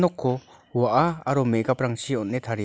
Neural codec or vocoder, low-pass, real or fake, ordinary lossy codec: none; none; real; none